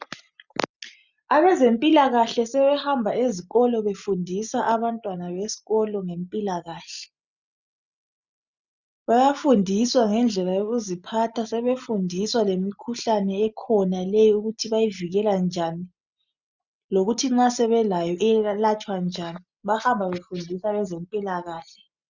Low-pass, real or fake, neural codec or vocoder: 7.2 kHz; real; none